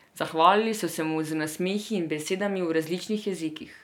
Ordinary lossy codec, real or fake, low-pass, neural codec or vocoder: none; real; 19.8 kHz; none